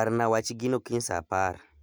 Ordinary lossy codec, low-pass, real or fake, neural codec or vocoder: none; none; real; none